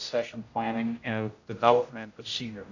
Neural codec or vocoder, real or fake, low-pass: codec, 16 kHz, 0.5 kbps, X-Codec, HuBERT features, trained on general audio; fake; 7.2 kHz